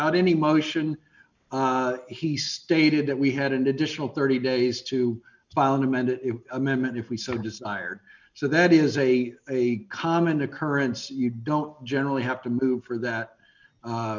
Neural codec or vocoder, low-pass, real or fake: none; 7.2 kHz; real